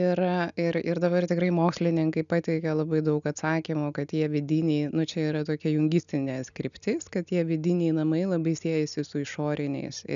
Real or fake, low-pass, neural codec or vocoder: real; 7.2 kHz; none